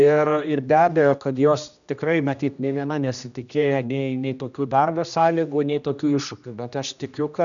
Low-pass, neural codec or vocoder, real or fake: 7.2 kHz; codec, 16 kHz, 1 kbps, X-Codec, HuBERT features, trained on general audio; fake